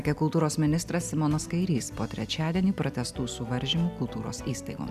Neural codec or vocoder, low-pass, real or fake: none; 14.4 kHz; real